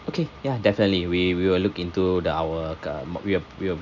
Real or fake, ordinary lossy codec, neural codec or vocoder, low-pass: real; none; none; 7.2 kHz